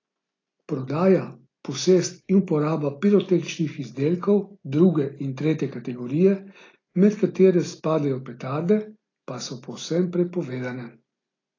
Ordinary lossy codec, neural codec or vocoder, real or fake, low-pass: AAC, 32 kbps; none; real; 7.2 kHz